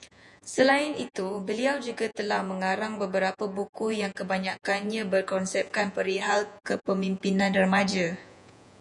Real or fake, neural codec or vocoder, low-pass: fake; vocoder, 48 kHz, 128 mel bands, Vocos; 10.8 kHz